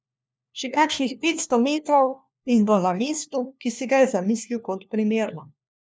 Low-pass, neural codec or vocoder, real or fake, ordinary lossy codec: none; codec, 16 kHz, 1 kbps, FunCodec, trained on LibriTTS, 50 frames a second; fake; none